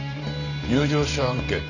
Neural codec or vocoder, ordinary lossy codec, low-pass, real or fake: vocoder, 44.1 kHz, 128 mel bands every 512 samples, BigVGAN v2; none; 7.2 kHz; fake